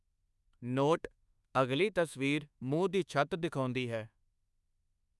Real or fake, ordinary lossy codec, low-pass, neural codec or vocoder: fake; none; none; codec, 24 kHz, 1.2 kbps, DualCodec